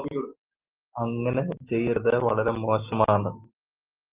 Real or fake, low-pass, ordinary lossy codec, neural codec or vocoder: real; 3.6 kHz; Opus, 32 kbps; none